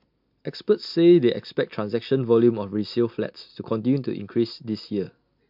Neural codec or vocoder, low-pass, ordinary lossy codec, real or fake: none; 5.4 kHz; MP3, 48 kbps; real